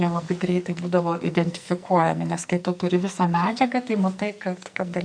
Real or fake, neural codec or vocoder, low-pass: fake; codec, 44.1 kHz, 2.6 kbps, SNAC; 9.9 kHz